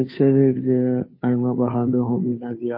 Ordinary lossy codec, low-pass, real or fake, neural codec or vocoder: MP3, 24 kbps; 5.4 kHz; fake; codec, 16 kHz, 2 kbps, FunCodec, trained on Chinese and English, 25 frames a second